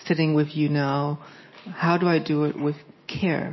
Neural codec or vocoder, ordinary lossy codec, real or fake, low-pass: none; MP3, 24 kbps; real; 7.2 kHz